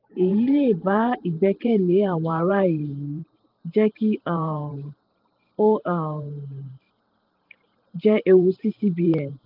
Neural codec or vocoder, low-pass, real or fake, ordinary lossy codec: vocoder, 44.1 kHz, 128 mel bands every 512 samples, BigVGAN v2; 5.4 kHz; fake; Opus, 32 kbps